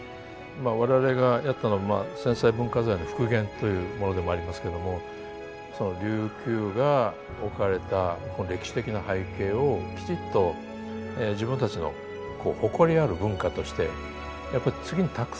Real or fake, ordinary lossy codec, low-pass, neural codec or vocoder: real; none; none; none